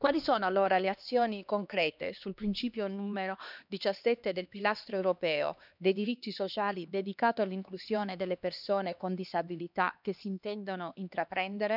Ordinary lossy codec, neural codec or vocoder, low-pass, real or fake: none; codec, 16 kHz, 2 kbps, X-Codec, HuBERT features, trained on LibriSpeech; 5.4 kHz; fake